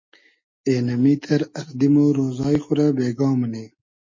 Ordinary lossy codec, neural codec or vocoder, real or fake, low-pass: MP3, 32 kbps; none; real; 7.2 kHz